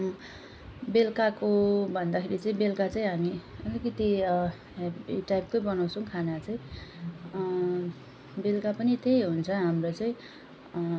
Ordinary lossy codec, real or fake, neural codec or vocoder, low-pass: none; real; none; none